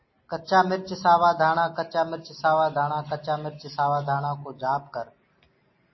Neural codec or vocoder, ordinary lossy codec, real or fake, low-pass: none; MP3, 24 kbps; real; 7.2 kHz